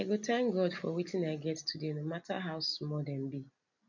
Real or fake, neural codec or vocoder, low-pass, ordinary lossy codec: real; none; 7.2 kHz; none